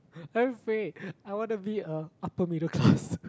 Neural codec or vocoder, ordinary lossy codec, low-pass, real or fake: none; none; none; real